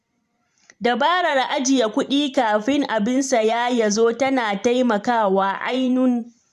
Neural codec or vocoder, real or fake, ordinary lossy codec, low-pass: vocoder, 44.1 kHz, 128 mel bands every 512 samples, BigVGAN v2; fake; none; 14.4 kHz